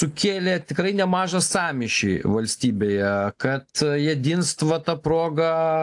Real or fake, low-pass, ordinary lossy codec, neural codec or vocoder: real; 10.8 kHz; AAC, 64 kbps; none